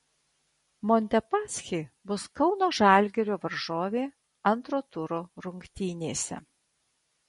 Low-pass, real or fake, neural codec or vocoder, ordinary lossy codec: 19.8 kHz; real; none; MP3, 48 kbps